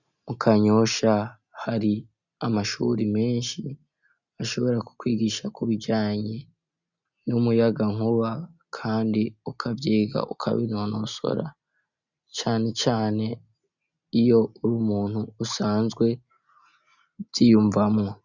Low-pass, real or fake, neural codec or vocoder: 7.2 kHz; real; none